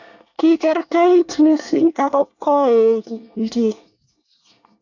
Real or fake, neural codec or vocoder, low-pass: fake; codec, 24 kHz, 1 kbps, SNAC; 7.2 kHz